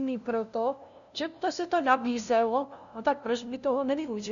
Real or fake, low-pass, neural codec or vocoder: fake; 7.2 kHz; codec, 16 kHz, 0.5 kbps, FunCodec, trained on LibriTTS, 25 frames a second